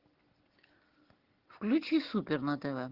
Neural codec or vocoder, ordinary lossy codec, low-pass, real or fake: none; Opus, 16 kbps; 5.4 kHz; real